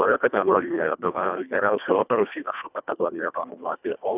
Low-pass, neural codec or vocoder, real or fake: 3.6 kHz; codec, 24 kHz, 1.5 kbps, HILCodec; fake